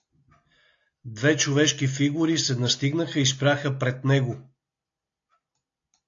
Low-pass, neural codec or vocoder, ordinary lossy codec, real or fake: 7.2 kHz; none; AAC, 48 kbps; real